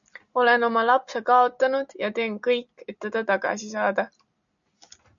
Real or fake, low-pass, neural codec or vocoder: real; 7.2 kHz; none